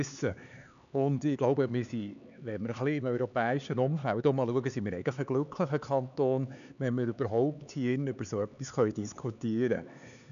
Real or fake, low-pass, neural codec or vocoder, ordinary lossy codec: fake; 7.2 kHz; codec, 16 kHz, 4 kbps, X-Codec, HuBERT features, trained on LibriSpeech; none